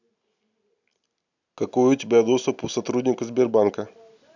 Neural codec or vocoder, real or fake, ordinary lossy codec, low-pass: none; real; none; 7.2 kHz